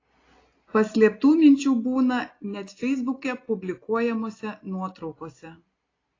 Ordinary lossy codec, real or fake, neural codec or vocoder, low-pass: AAC, 32 kbps; real; none; 7.2 kHz